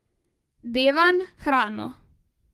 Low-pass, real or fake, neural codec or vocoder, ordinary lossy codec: 14.4 kHz; fake; codec, 32 kHz, 1.9 kbps, SNAC; Opus, 24 kbps